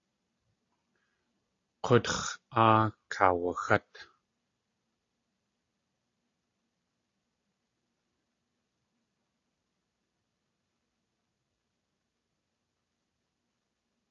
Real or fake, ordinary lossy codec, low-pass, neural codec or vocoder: real; AAC, 32 kbps; 7.2 kHz; none